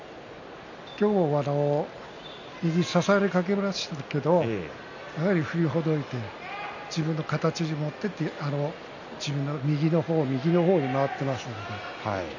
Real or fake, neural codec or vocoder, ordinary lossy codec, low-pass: real; none; none; 7.2 kHz